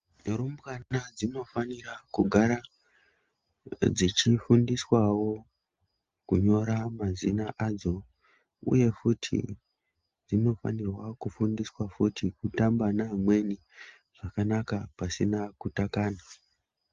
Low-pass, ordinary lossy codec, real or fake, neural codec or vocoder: 7.2 kHz; Opus, 32 kbps; real; none